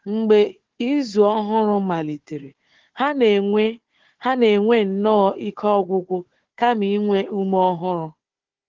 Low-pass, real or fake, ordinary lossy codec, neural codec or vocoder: 7.2 kHz; fake; Opus, 16 kbps; codec, 16 kHz, 4 kbps, FunCodec, trained on Chinese and English, 50 frames a second